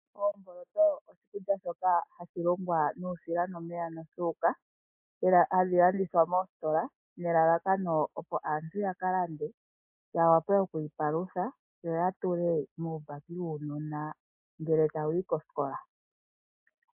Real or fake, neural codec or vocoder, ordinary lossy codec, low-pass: real; none; MP3, 32 kbps; 3.6 kHz